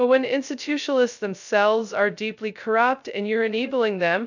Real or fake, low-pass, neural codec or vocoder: fake; 7.2 kHz; codec, 16 kHz, 0.2 kbps, FocalCodec